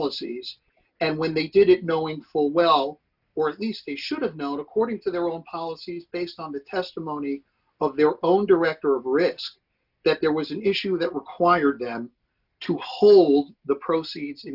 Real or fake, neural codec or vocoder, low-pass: real; none; 5.4 kHz